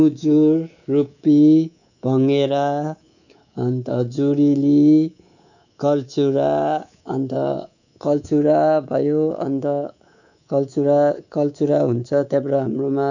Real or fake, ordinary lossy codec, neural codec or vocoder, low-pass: fake; none; codec, 24 kHz, 3.1 kbps, DualCodec; 7.2 kHz